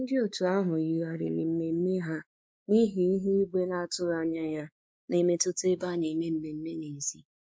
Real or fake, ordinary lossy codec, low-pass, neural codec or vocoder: fake; none; none; codec, 16 kHz, 4 kbps, X-Codec, WavLM features, trained on Multilingual LibriSpeech